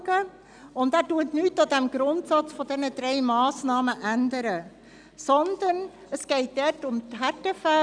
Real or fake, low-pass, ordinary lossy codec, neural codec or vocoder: real; 9.9 kHz; none; none